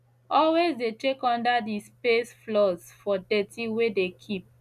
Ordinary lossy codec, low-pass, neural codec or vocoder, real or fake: none; 14.4 kHz; none; real